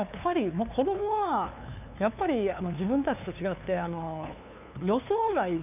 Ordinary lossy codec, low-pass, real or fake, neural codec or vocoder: none; 3.6 kHz; fake; codec, 16 kHz, 2 kbps, FunCodec, trained on LibriTTS, 25 frames a second